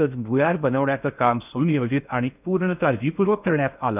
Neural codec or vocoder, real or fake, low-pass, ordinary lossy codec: codec, 16 kHz in and 24 kHz out, 0.6 kbps, FocalCodec, streaming, 4096 codes; fake; 3.6 kHz; none